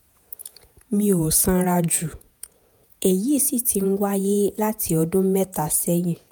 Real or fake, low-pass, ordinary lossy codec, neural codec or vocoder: fake; none; none; vocoder, 48 kHz, 128 mel bands, Vocos